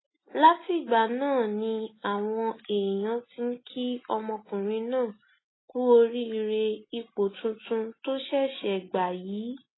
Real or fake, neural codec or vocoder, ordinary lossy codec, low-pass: real; none; AAC, 16 kbps; 7.2 kHz